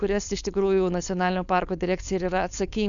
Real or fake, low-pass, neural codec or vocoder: fake; 7.2 kHz; codec, 16 kHz, 4.8 kbps, FACodec